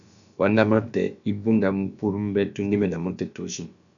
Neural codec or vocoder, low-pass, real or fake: codec, 16 kHz, about 1 kbps, DyCAST, with the encoder's durations; 7.2 kHz; fake